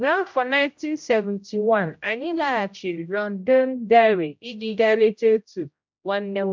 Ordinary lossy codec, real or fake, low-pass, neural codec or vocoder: MP3, 64 kbps; fake; 7.2 kHz; codec, 16 kHz, 0.5 kbps, X-Codec, HuBERT features, trained on general audio